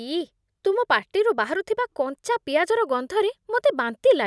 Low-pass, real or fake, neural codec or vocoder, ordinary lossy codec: none; real; none; none